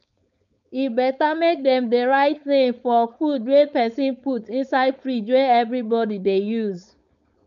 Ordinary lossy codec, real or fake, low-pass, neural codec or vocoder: none; fake; 7.2 kHz; codec, 16 kHz, 4.8 kbps, FACodec